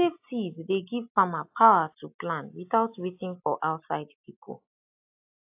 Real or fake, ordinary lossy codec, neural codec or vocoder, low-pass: real; AAC, 32 kbps; none; 3.6 kHz